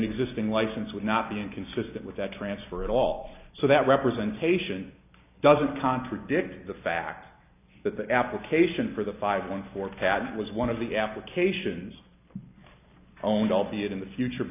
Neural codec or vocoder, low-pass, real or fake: none; 3.6 kHz; real